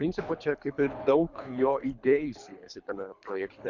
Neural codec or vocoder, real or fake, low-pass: codec, 24 kHz, 3 kbps, HILCodec; fake; 7.2 kHz